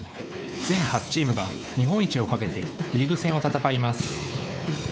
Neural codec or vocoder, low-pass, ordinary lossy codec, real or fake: codec, 16 kHz, 4 kbps, X-Codec, WavLM features, trained on Multilingual LibriSpeech; none; none; fake